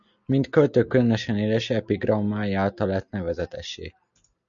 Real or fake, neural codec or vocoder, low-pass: real; none; 7.2 kHz